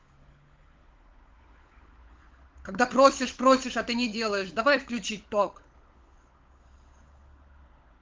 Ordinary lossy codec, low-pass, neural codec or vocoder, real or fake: Opus, 32 kbps; 7.2 kHz; codec, 16 kHz, 16 kbps, FunCodec, trained on LibriTTS, 50 frames a second; fake